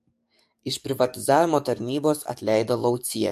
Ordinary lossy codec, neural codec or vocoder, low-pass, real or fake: MP3, 64 kbps; codec, 44.1 kHz, 7.8 kbps, DAC; 14.4 kHz; fake